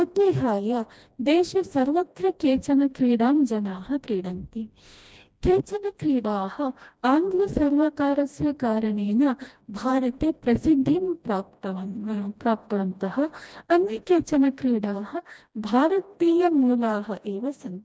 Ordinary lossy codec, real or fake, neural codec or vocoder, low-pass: none; fake; codec, 16 kHz, 1 kbps, FreqCodec, smaller model; none